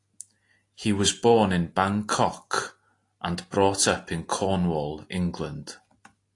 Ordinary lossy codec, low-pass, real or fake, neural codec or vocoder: AAC, 48 kbps; 10.8 kHz; real; none